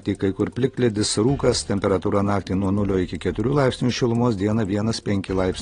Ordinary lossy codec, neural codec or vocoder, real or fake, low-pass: AAC, 32 kbps; none; real; 9.9 kHz